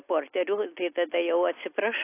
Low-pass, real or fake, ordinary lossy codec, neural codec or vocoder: 3.6 kHz; real; AAC, 24 kbps; none